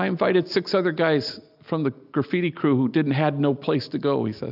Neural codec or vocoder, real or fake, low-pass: none; real; 5.4 kHz